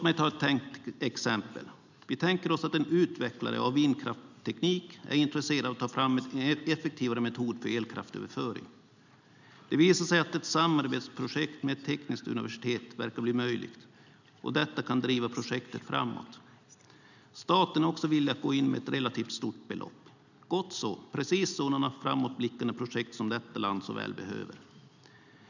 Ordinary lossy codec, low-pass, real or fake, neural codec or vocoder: none; 7.2 kHz; real; none